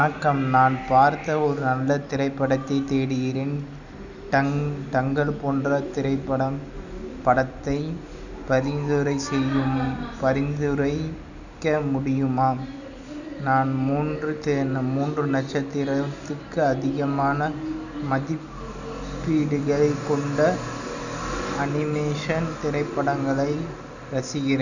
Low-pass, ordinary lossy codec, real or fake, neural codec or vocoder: 7.2 kHz; none; real; none